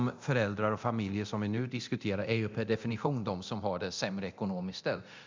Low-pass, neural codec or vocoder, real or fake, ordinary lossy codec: 7.2 kHz; codec, 24 kHz, 0.9 kbps, DualCodec; fake; MP3, 64 kbps